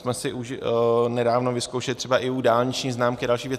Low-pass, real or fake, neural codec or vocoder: 14.4 kHz; real; none